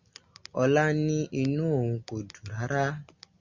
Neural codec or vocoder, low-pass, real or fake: none; 7.2 kHz; real